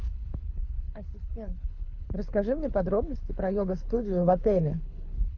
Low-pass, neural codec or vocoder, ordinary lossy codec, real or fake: 7.2 kHz; codec, 24 kHz, 6 kbps, HILCodec; AAC, 48 kbps; fake